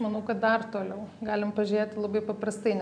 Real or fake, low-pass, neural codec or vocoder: real; 9.9 kHz; none